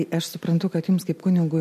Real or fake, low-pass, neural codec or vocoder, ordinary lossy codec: real; 14.4 kHz; none; MP3, 64 kbps